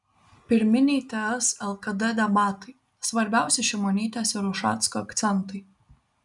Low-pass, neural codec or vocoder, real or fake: 10.8 kHz; none; real